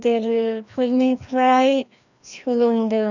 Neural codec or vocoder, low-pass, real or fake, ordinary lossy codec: codec, 16 kHz, 1 kbps, FreqCodec, larger model; 7.2 kHz; fake; none